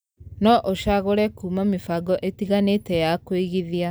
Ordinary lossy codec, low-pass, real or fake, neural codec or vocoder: none; none; real; none